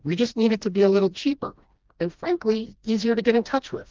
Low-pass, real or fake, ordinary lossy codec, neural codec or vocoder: 7.2 kHz; fake; Opus, 24 kbps; codec, 16 kHz, 1 kbps, FreqCodec, smaller model